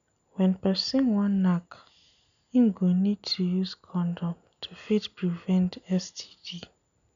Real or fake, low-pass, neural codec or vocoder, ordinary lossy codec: real; 7.2 kHz; none; none